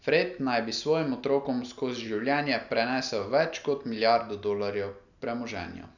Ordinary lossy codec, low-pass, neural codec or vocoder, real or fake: none; 7.2 kHz; none; real